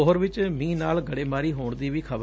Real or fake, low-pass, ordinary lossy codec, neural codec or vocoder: real; none; none; none